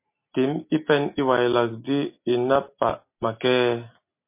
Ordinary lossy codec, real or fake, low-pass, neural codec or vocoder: MP3, 24 kbps; real; 3.6 kHz; none